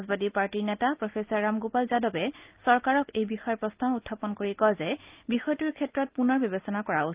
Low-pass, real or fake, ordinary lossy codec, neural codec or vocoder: 3.6 kHz; real; Opus, 32 kbps; none